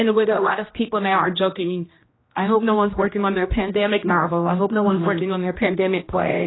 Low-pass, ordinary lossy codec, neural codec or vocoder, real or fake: 7.2 kHz; AAC, 16 kbps; codec, 16 kHz, 1 kbps, X-Codec, HuBERT features, trained on general audio; fake